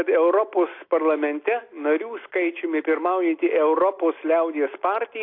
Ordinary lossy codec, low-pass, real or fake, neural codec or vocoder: AAC, 32 kbps; 5.4 kHz; real; none